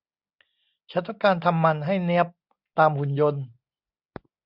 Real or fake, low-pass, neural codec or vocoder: real; 5.4 kHz; none